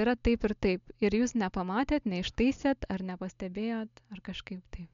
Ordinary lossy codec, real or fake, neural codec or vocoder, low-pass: MP3, 64 kbps; real; none; 7.2 kHz